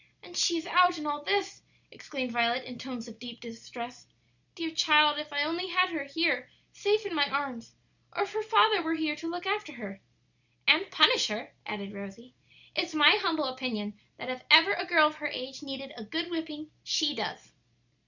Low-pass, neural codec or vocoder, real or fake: 7.2 kHz; none; real